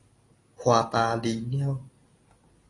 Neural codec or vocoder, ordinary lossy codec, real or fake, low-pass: none; AAC, 32 kbps; real; 10.8 kHz